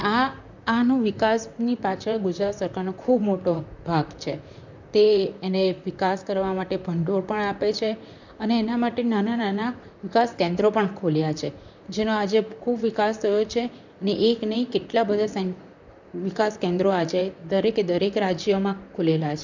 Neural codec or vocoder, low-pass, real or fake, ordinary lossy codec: vocoder, 44.1 kHz, 128 mel bands, Pupu-Vocoder; 7.2 kHz; fake; none